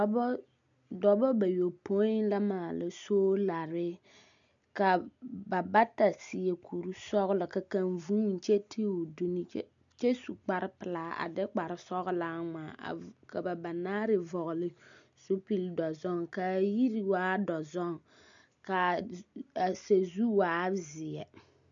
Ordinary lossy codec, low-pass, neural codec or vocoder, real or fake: MP3, 96 kbps; 7.2 kHz; none; real